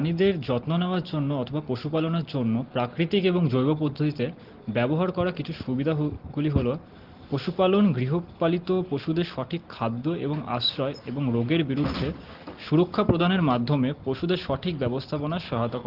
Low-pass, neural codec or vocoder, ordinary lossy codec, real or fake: 5.4 kHz; none; Opus, 16 kbps; real